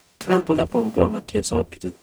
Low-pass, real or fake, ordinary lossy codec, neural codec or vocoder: none; fake; none; codec, 44.1 kHz, 0.9 kbps, DAC